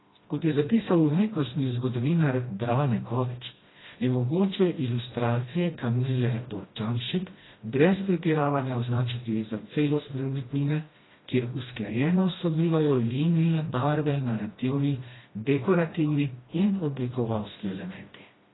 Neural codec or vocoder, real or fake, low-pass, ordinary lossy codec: codec, 16 kHz, 1 kbps, FreqCodec, smaller model; fake; 7.2 kHz; AAC, 16 kbps